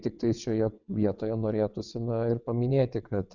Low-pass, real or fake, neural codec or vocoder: 7.2 kHz; fake; vocoder, 22.05 kHz, 80 mel bands, WaveNeXt